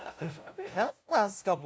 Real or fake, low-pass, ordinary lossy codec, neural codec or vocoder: fake; none; none; codec, 16 kHz, 0.5 kbps, FunCodec, trained on LibriTTS, 25 frames a second